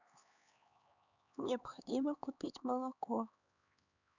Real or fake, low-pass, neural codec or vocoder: fake; 7.2 kHz; codec, 16 kHz, 4 kbps, X-Codec, HuBERT features, trained on LibriSpeech